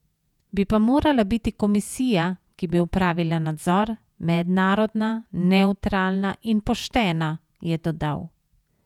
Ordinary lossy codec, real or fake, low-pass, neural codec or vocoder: none; fake; 19.8 kHz; vocoder, 48 kHz, 128 mel bands, Vocos